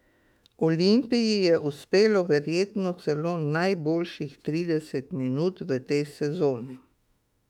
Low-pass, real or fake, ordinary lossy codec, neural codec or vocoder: 19.8 kHz; fake; none; autoencoder, 48 kHz, 32 numbers a frame, DAC-VAE, trained on Japanese speech